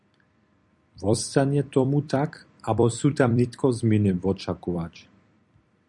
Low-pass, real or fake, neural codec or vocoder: 10.8 kHz; fake; vocoder, 44.1 kHz, 128 mel bands every 256 samples, BigVGAN v2